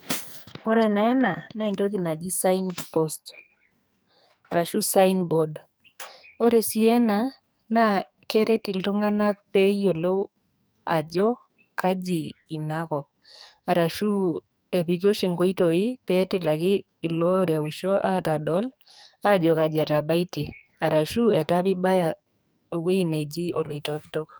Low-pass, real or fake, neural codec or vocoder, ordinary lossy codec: none; fake; codec, 44.1 kHz, 2.6 kbps, SNAC; none